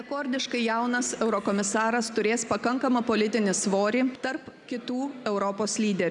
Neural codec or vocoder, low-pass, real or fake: none; 10.8 kHz; real